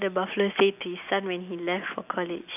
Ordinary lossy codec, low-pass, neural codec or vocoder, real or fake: none; 3.6 kHz; none; real